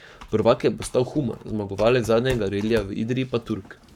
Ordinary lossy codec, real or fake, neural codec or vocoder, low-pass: none; fake; codec, 44.1 kHz, 7.8 kbps, Pupu-Codec; 19.8 kHz